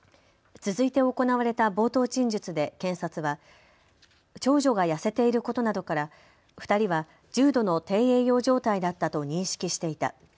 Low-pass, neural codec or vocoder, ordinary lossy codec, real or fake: none; none; none; real